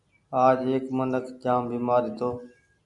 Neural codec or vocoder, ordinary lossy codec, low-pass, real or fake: none; MP3, 96 kbps; 10.8 kHz; real